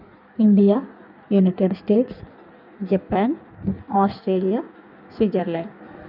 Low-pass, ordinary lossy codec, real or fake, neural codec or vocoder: 5.4 kHz; none; fake; codec, 16 kHz in and 24 kHz out, 1.1 kbps, FireRedTTS-2 codec